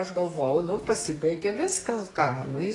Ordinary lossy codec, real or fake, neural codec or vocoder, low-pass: AAC, 32 kbps; fake; codec, 32 kHz, 1.9 kbps, SNAC; 10.8 kHz